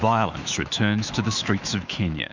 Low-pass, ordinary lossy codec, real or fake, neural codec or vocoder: 7.2 kHz; Opus, 64 kbps; fake; autoencoder, 48 kHz, 128 numbers a frame, DAC-VAE, trained on Japanese speech